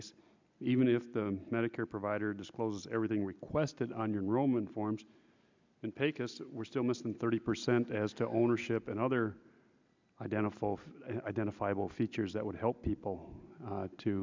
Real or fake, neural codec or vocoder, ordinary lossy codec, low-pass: real; none; MP3, 64 kbps; 7.2 kHz